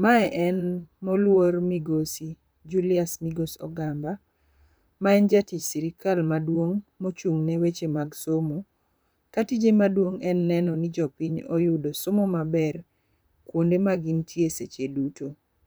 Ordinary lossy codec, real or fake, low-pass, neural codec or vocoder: none; fake; none; vocoder, 44.1 kHz, 128 mel bands, Pupu-Vocoder